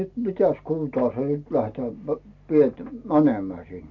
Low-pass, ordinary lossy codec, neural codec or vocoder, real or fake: 7.2 kHz; AAC, 48 kbps; none; real